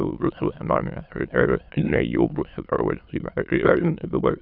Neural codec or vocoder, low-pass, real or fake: autoencoder, 22.05 kHz, a latent of 192 numbers a frame, VITS, trained on many speakers; 5.4 kHz; fake